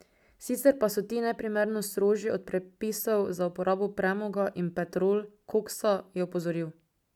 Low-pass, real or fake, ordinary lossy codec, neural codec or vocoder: 19.8 kHz; real; none; none